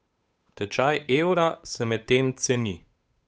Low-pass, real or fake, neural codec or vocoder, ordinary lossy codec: none; fake; codec, 16 kHz, 8 kbps, FunCodec, trained on Chinese and English, 25 frames a second; none